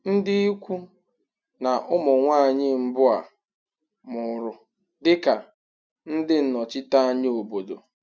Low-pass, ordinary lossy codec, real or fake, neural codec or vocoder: none; none; real; none